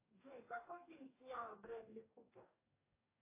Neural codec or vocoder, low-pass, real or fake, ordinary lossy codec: codec, 44.1 kHz, 2.6 kbps, DAC; 3.6 kHz; fake; AAC, 24 kbps